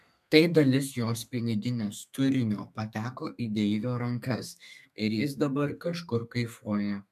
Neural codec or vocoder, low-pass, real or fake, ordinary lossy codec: codec, 32 kHz, 1.9 kbps, SNAC; 14.4 kHz; fake; MP3, 96 kbps